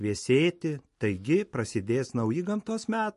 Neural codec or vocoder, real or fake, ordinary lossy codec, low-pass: none; real; MP3, 48 kbps; 14.4 kHz